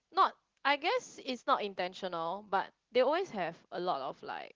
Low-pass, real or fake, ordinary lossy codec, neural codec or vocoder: 7.2 kHz; real; Opus, 16 kbps; none